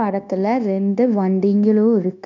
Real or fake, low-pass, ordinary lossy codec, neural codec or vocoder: fake; 7.2 kHz; none; codec, 16 kHz, 0.9 kbps, LongCat-Audio-Codec